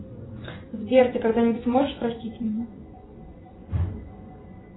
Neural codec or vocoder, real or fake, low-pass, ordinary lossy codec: vocoder, 24 kHz, 100 mel bands, Vocos; fake; 7.2 kHz; AAC, 16 kbps